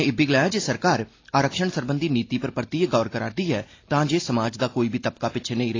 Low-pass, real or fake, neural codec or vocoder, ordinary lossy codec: 7.2 kHz; real; none; AAC, 32 kbps